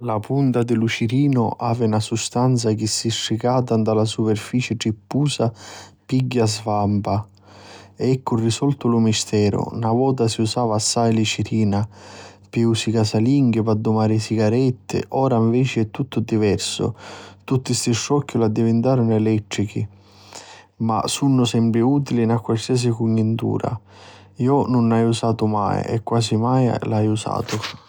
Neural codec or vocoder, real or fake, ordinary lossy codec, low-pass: none; real; none; none